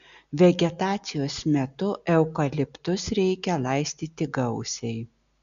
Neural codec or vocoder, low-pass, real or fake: none; 7.2 kHz; real